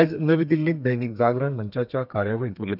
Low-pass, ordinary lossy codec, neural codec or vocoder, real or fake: 5.4 kHz; none; codec, 32 kHz, 1.9 kbps, SNAC; fake